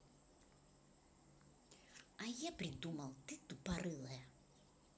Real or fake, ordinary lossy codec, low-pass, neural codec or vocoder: real; none; none; none